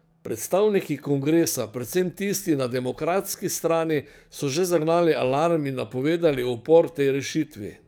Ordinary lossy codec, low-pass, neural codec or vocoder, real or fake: none; none; codec, 44.1 kHz, 7.8 kbps, DAC; fake